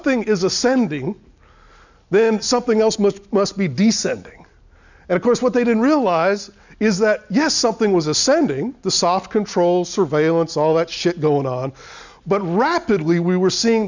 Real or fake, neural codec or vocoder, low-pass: real; none; 7.2 kHz